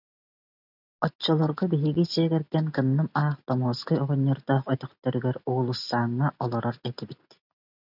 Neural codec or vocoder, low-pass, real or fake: none; 5.4 kHz; real